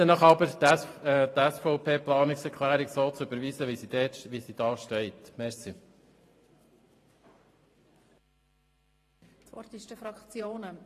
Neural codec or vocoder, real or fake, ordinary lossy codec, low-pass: vocoder, 44.1 kHz, 128 mel bands every 512 samples, BigVGAN v2; fake; AAC, 48 kbps; 14.4 kHz